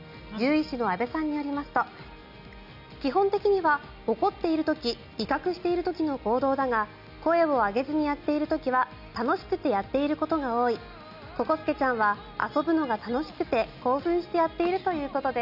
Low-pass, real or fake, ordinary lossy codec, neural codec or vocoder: 5.4 kHz; real; none; none